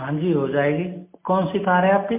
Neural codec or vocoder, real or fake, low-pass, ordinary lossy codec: none; real; 3.6 kHz; MP3, 24 kbps